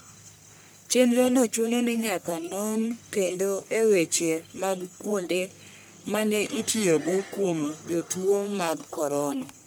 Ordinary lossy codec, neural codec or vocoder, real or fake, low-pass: none; codec, 44.1 kHz, 1.7 kbps, Pupu-Codec; fake; none